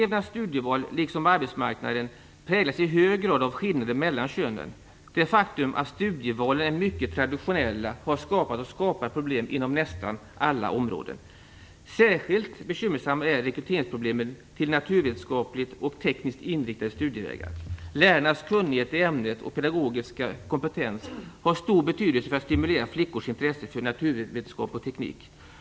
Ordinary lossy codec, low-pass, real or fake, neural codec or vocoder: none; none; real; none